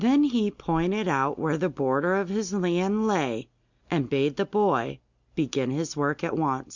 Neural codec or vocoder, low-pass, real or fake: none; 7.2 kHz; real